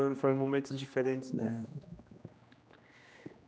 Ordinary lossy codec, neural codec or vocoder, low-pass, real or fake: none; codec, 16 kHz, 1 kbps, X-Codec, HuBERT features, trained on general audio; none; fake